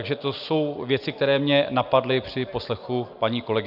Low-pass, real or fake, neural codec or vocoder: 5.4 kHz; real; none